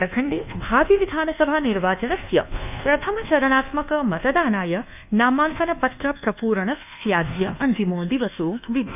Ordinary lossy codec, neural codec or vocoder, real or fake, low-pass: none; codec, 24 kHz, 1.2 kbps, DualCodec; fake; 3.6 kHz